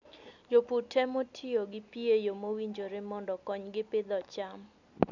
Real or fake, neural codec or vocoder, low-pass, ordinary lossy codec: real; none; 7.2 kHz; none